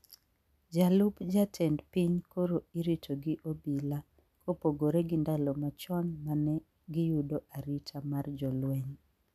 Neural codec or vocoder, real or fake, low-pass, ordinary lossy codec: none; real; 14.4 kHz; none